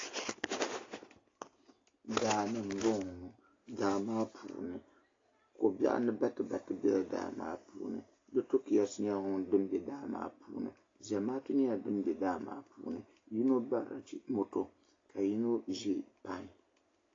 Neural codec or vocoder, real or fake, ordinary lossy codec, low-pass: none; real; AAC, 32 kbps; 7.2 kHz